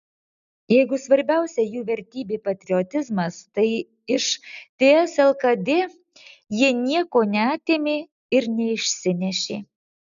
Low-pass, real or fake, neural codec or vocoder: 7.2 kHz; real; none